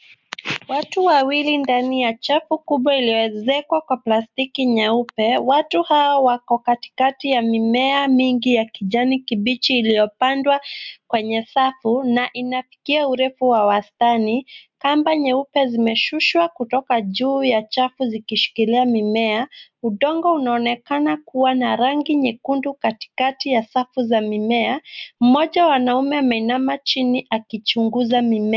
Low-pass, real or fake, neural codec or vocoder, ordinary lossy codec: 7.2 kHz; real; none; MP3, 64 kbps